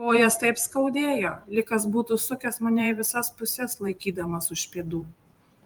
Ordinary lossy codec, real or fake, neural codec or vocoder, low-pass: Opus, 32 kbps; fake; vocoder, 44.1 kHz, 128 mel bands every 512 samples, BigVGAN v2; 19.8 kHz